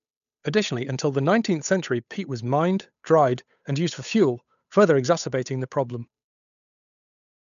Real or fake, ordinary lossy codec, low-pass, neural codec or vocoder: fake; none; 7.2 kHz; codec, 16 kHz, 8 kbps, FunCodec, trained on Chinese and English, 25 frames a second